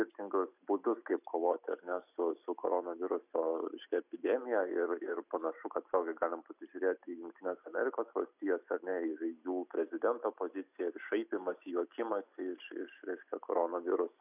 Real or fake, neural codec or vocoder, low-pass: real; none; 3.6 kHz